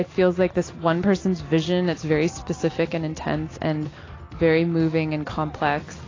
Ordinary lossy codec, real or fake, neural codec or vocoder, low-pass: AAC, 32 kbps; real; none; 7.2 kHz